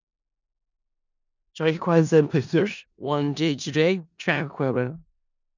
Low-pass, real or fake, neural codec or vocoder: 7.2 kHz; fake; codec, 16 kHz in and 24 kHz out, 0.4 kbps, LongCat-Audio-Codec, four codebook decoder